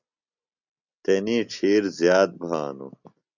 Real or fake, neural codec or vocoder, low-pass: real; none; 7.2 kHz